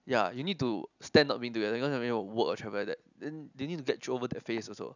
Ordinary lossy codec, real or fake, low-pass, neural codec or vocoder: none; real; 7.2 kHz; none